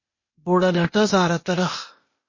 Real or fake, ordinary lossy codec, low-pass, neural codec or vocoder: fake; MP3, 32 kbps; 7.2 kHz; codec, 16 kHz, 0.8 kbps, ZipCodec